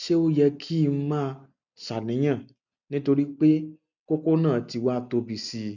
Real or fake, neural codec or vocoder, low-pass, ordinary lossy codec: real; none; 7.2 kHz; none